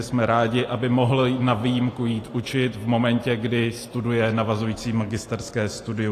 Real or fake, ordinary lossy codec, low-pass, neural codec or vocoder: real; AAC, 48 kbps; 14.4 kHz; none